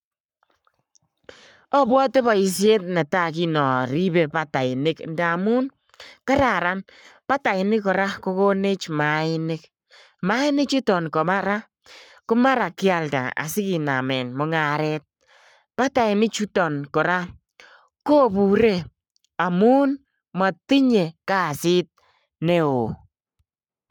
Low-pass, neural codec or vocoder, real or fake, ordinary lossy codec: 19.8 kHz; codec, 44.1 kHz, 7.8 kbps, Pupu-Codec; fake; none